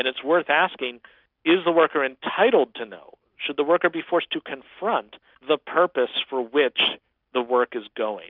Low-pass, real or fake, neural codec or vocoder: 5.4 kHz; real; none